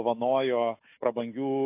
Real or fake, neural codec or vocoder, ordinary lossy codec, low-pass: fake; autoencoder, 48 kHz, 128 numbers a frame, DAC-VAE, trained on Japanese speech; AAC, 24 kbps; 3.6 kHz